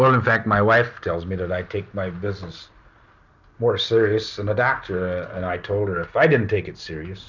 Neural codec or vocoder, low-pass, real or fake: none; 7.2 kHz; real